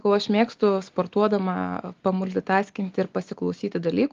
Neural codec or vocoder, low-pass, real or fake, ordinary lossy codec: none; 7.2 kHz; real; Opus, 32 kbps